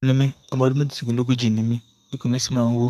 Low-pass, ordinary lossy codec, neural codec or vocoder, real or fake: 14.4 kHz; none; codec, 32 kHz, 1.9 kbps, SNAC; fake